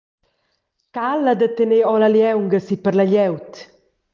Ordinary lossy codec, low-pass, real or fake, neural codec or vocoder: Opus, 32 kbps; 7.2 kHz; real; none